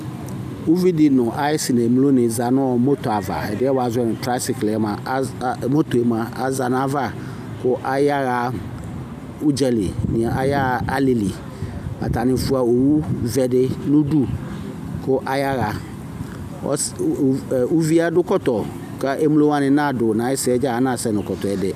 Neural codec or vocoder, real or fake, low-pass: none; real; 14.4 kHz